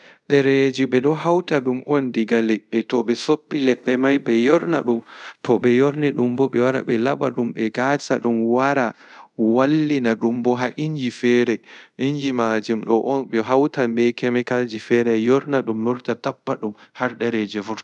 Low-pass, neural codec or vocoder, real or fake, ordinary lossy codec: 10.8 kHz; codec, 24 kHz, 0.5 kbps, DualCodec; fake; none